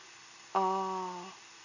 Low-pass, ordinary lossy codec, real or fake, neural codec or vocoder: 7.2 kHz; none; real; none